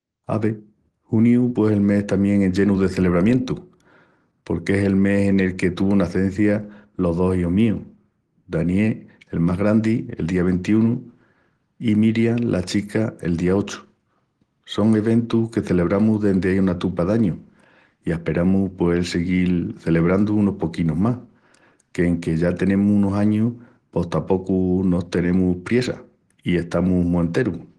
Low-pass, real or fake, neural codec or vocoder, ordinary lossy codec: 10.8 kHz; real; none; Opus, 16 kbps